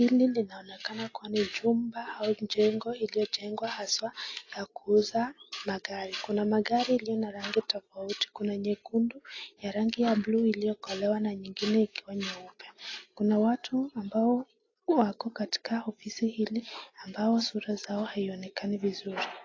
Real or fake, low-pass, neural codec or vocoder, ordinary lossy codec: real; 7.2 kHz; none; AAC, 32 kbps